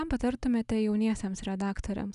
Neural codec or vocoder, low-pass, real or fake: none; 10.8 kHz; real